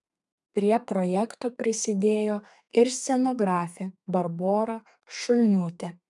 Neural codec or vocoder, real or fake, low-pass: codec, 32 kHz, 1.9 kbps, SNAC; fake; 10.8 kHz